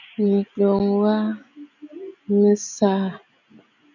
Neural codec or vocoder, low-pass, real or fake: none; 7.2 kHz; real